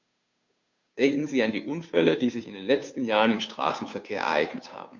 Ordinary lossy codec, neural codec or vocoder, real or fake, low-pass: MP3, 64 kbps; codec, 16 kHz, 2 kbps, FunCodec, trained on Chinese and English, 25 frames a second; fake; 7.2 kHz